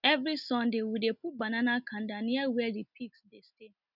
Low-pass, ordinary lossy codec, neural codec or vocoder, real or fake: 5.4 kHz; none; none; real